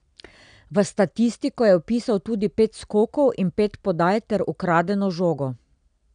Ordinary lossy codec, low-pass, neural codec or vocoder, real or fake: none; 9.9 kHz; none; real